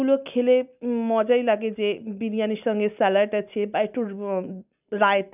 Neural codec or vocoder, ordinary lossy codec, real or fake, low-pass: none; none; real; 3.6 kHz